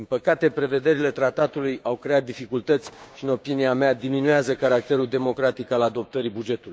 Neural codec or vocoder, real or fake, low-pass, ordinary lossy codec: codec, 16 kHz, 6 kbps, DAC; fake; none; none